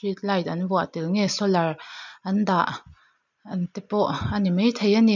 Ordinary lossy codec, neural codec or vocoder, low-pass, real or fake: none; none; 7.2 kHz; real